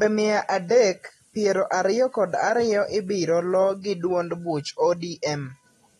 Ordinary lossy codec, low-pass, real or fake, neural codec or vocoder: AAC, 32 kbps; 19.8 kHz; real; none